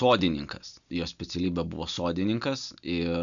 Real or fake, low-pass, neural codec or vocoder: real; 7.2 kHz; none